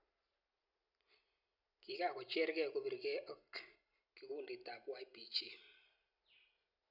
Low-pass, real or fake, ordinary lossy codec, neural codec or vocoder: 5.4 kHz; real; none; none